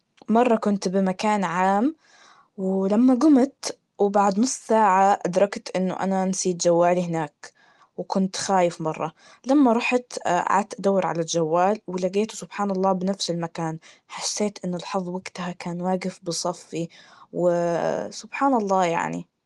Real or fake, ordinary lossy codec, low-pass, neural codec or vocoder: real; Opus, 24 kbps; 10.8 kHz; none